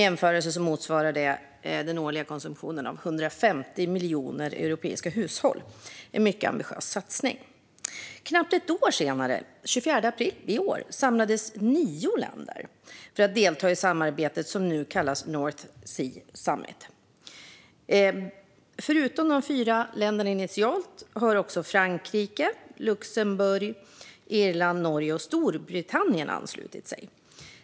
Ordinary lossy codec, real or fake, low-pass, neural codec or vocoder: none; real; none; none